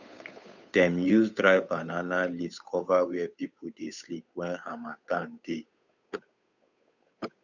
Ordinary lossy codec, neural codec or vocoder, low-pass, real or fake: none; codec, 16 kHz, 8 kbps, FunCodec, trained on Chinese and English, 25 frames a second; 7.2 kHz; fake